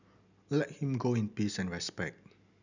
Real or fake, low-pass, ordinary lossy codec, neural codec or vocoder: real; 7.2 kHz; none; none